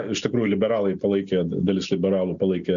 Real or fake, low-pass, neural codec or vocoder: real; 7.2 kHz; none